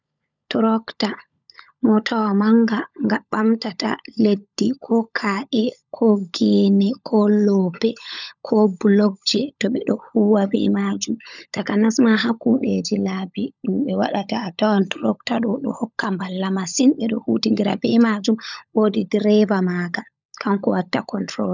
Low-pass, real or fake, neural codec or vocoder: 7.2 kHz; fake; codec, 16 kHz, 16 kbps, FunCodec, trained on LibriTTS, 50 frames a second